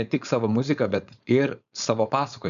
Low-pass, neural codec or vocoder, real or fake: 7.2 kHz; codec, 16 kHz, 4.8 kbps, FACodec; fake